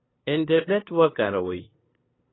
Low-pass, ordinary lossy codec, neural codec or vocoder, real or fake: 7.2 kHz; AAC, 16 kbps; codec, 16 kHz, 2 kbps, FunCodec, trained on LibriTTS, 25 frames a second; fake